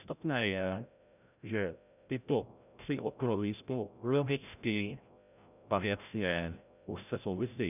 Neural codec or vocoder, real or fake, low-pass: codec, 16 kHz, 0.5 kbps, FreqCodec, larger model; fake; 3.6 kHz